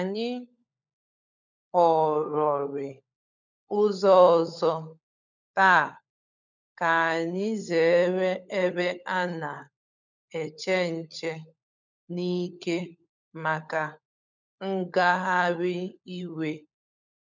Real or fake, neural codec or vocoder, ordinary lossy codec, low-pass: fake; codec, 16 kHz, 16 kbps, FunCodec, trained on LibriTTS, 50 frames a second; none; 7.2 kHz